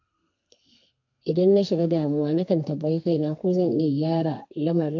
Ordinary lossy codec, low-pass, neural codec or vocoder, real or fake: AAC, 48 kbps; 7.2 kHz; codec, 32 kHz, 1.9 kbps, SNAC; fake